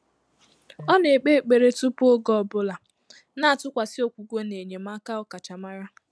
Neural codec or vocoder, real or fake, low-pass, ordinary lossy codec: none; real; none; none